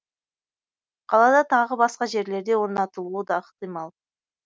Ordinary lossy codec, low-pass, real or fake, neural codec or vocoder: none; none; real; none